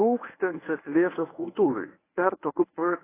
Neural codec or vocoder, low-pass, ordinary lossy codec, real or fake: codec, 24 kHz, 0.9 kbps, WavTokenizer, medium speech release version 1; 3.6 kHz; AAC, 16 kbps; fake